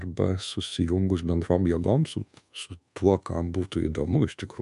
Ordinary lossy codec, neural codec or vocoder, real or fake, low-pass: MP3, 64 kbps; codec, 24 kHz, 1.2 kbps, DualCodec; fake; 10.8 kHz